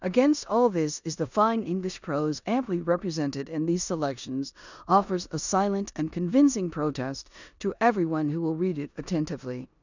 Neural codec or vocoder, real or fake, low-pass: codec, 16 kHz in and 24 kHz out, 0.9 kbps, LongCat-Audio-Codec, four codebook decoder; fake; 7.2 kHz